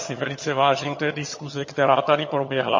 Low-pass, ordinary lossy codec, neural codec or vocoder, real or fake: 7.2 kHz; MP3, 32 kbps; vocoder, 22.05 kHz, 80 mel bands, HiFi-GAN; fake